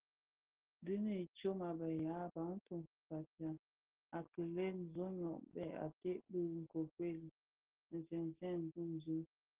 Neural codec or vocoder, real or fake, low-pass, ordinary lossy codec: none; real; 3.6 kHz; Opus, 16 kbps